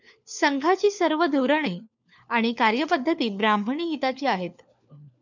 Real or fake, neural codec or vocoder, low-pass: fake; codec, 16 kHz, 4 kbps, FunCodec, trained on LibriTTS, 50 frames a second; 7.2 kHz